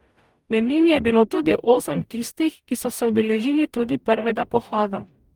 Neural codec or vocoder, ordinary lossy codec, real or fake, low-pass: codec, 44.1 kHz, 0.9 kbps, DAC; Opus, 32 kbps; fake; 19.8 kHz